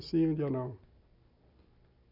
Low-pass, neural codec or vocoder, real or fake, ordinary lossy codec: 5.4 kHz; none; real; none